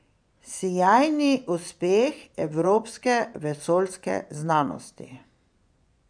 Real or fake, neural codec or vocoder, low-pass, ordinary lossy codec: fake; vocoder, 24 kHz, 100 mel bands, Vocos; 9.9 kHz; none